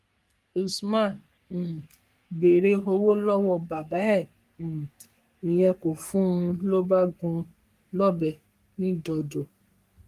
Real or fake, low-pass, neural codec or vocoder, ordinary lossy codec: fake; 14.4 kHz; codec, 44.1 kHz, 3.4 kbps, Pupu-Codec; Opus, 32 kbps